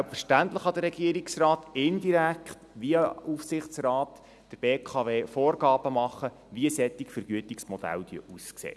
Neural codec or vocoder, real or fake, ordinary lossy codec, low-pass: none; real; none; none